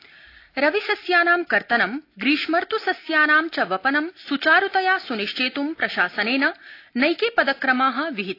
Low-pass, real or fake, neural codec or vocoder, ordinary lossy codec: 5.4 kHz; real; none; AAC, 32 kbps